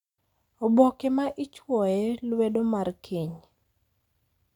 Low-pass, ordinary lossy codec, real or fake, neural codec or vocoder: 19.8 kHz; none; real; none